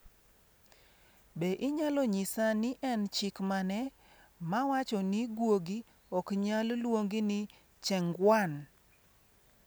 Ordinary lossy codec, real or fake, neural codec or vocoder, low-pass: none; real; none; none